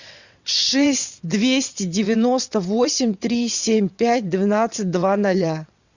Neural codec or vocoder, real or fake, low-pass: vocoder, 22.05 kHz, 80 mel bands, WaveNeXt; fake; 7.2 kHz